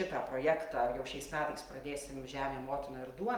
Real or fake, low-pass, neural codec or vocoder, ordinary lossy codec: real; 19.8 kHz; none; Opus, 32 kbps